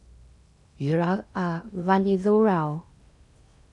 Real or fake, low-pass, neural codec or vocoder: fake; 10.8 kHz; codec, 16 kHz in and 24 kHz out, 0.8 kbps, FocalCodec, streaming, 65536 codes